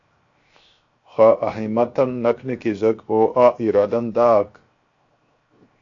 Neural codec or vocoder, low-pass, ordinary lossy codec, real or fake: codec, 16 kHz, 0.7 kbps, FocalCodec; 7.2 kHz; AAC, 48 kbps; fake